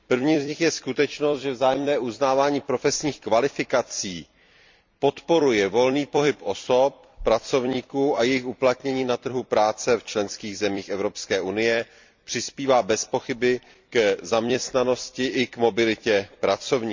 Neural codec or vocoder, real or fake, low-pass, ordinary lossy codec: vocoder, 44.1 kHz, 128 mel bands every 256 samples, BigVGAN v2; fake; 7.2 kHz; MP3, 64 kbps